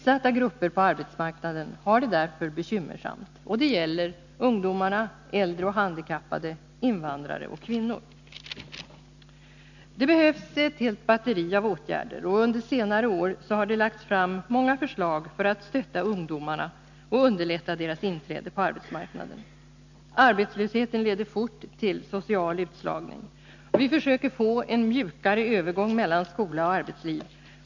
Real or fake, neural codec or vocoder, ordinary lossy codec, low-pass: real; none; none; 7.2 kHz